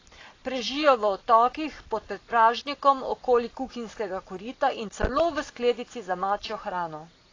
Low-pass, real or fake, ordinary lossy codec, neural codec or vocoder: 7.2 kHz; real; AAC, 32 kbps; none